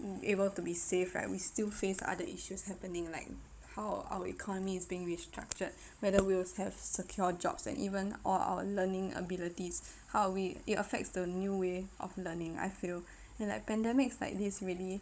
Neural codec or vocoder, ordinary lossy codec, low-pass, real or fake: codec, 16 kHz, 16 kbps, FunCodec, trained on Chinese and English, 50 frames a second; none; none; fake